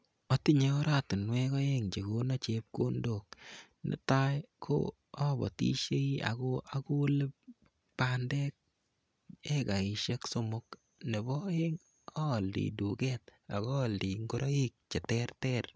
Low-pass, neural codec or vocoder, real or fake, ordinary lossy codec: none; none; real; none